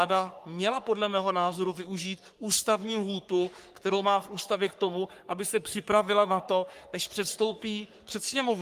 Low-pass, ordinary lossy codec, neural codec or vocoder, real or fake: 14.4 kHz; Opus, 32 kbps; codec, 44.1 kHz, 3.4 kbps, Pupu-Codec; fake